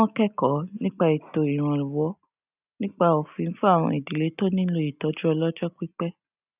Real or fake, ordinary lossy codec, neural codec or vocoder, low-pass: real; none; none; 3.6 kHz